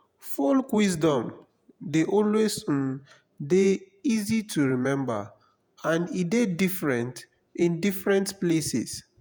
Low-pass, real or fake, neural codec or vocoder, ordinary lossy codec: none; fake; vocoder, 48 kHz, 128 mel bands, Vocos; none